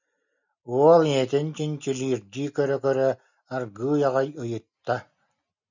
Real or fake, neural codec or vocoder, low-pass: real; none; 7.2 kHz